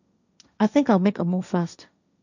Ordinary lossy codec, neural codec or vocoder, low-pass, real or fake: none; codec, 16 kHz, 1.1 kbps, Voila-Tokenizer; 7.2 kHz; fake